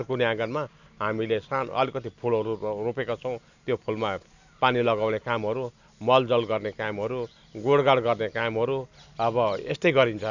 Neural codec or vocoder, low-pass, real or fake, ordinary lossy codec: none; 7.2 kHz; real; none